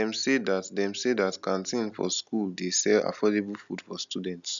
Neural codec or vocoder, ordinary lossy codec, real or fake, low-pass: none; none; real; 7.2 kHz